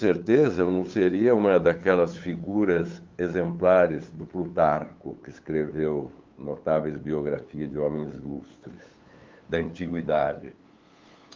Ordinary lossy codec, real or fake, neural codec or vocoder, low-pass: Opus, 24 kbps; fake; codec, 16 kHz, 8 kbps, FunCodec, trained on LibriTTS, 25 frames a second; 7.2 kHz